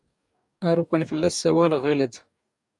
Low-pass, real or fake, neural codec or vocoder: 10.8 kHz; fake; codec, 44.1 kHz, 2.6 kbps, DAC